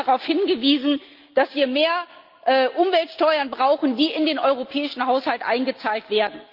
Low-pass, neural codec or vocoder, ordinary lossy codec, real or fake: 5.4 kHz; none; Opus, 24 kbps; real